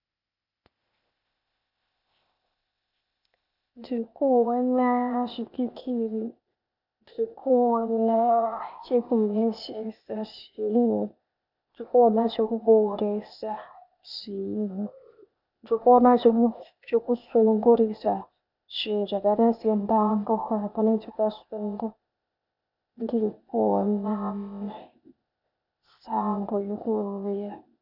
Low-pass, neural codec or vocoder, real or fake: 5.4 kHz; codec, 16 kHz, 0.8 kbps, ZipCodec; fake